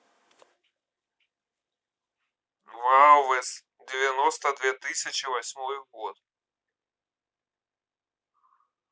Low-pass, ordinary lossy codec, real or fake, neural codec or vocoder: none; none; real; none